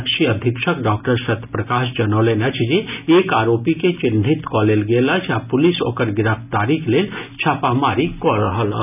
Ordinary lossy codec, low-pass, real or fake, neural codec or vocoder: none; 3.6 kHz; real; none